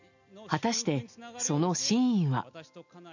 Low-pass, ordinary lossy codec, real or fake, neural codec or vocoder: 7.2 kHz; none; real; none